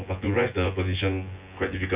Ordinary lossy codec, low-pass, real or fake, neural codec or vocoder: Opus, 64 kbps; 3.6 kHz; fake; vocoder, 24 kHz, 100 mel bands, Vocos